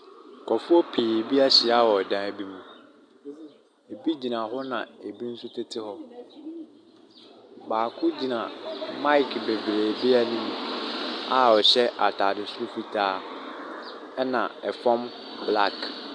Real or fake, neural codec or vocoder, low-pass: real; none; 9.9 kHz